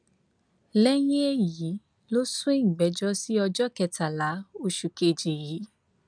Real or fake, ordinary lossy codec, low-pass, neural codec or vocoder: real; none; 9.9 kHz; none